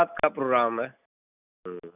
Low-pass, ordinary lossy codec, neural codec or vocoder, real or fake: 3.6 kHz; none; none; real